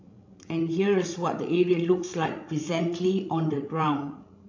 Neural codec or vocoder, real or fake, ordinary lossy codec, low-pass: codec, 16 kHz, 16 kbps, FreqCodec, larger model; fake; AAC, 48 kbps; 7.2 kHz